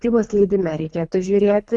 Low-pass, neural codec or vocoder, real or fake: 10.8 kHz; codec, 24 kHz, 3 kbps, HILCodec; fake